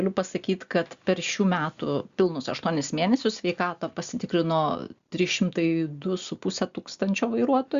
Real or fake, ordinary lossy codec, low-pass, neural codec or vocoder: real; Opus, 64 kbps; 7.2 kHz; none